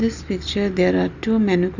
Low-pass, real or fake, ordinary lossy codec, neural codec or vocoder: 7.2 kHz; real; none; none